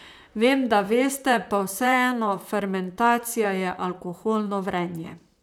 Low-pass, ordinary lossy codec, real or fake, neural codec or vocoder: 19.8 kHz; none; fake; vocoder, 44.1 kHz, 128 mel bands, Pupu-Vocoder